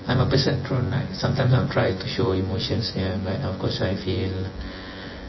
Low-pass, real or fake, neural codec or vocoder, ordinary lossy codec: 7.2 kHz; fake; vocoder, 24 kHz, 100 mel bands, Vocos; MP3, 24 kbps